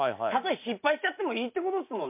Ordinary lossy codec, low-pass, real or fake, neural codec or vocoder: MP3, 32 kbps; 3.6 kHz; real; none